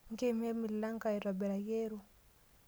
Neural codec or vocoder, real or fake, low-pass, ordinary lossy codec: none; real; none; none